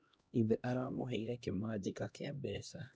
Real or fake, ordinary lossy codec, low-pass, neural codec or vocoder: fake; none; none; codec, 16 kHz, 1 kbps, X-Codec, HuBERT features, trained on LibriSpeech